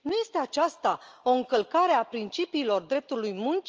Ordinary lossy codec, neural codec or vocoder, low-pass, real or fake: Opus, 24 kbps; none; 7.2 kHz; real